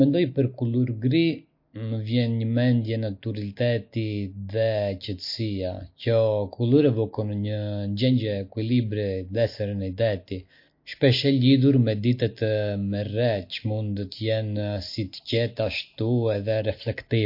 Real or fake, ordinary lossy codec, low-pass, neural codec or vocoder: real; MP3, 32 kbps; 5.4 kHz; none